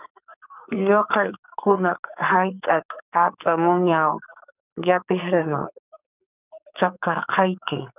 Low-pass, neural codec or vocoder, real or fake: 3.6 kHz; codec, 44.1 kHz, 2.6 kbps, SNAC; fake